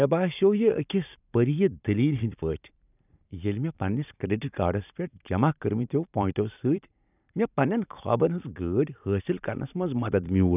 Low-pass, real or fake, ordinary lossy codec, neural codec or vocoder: 3.6 kHz; fake; none; vocoder, 44.1 kHz, 128 mel bands every 512 samples, BigVGAN v2